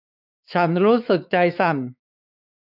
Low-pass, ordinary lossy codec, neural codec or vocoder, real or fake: 5.4 kHz; none; codec, 16 kHz, 4 kbps, X-Codec, WavLM features, trained on Multilingual LibriSpeech; fake